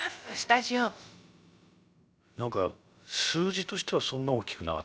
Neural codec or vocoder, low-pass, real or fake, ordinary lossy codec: codec, 16 kHz, about 1 kbps, DyCAST, with the encoder's durations; none; fake; none